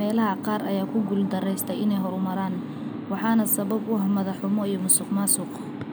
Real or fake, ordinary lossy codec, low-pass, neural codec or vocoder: real; none; none; none